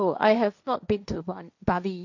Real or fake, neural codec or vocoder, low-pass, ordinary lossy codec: fake; codec, 16 kHz, 1.1 kbps, Voila-Tokenizer; 7.2 kHz; none